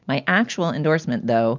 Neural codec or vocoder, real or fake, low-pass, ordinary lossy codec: none; real; 7.2 kHz; MP3, 64 kbps